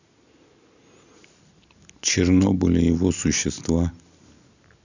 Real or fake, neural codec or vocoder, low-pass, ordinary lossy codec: real; none; 7.2 kHz; none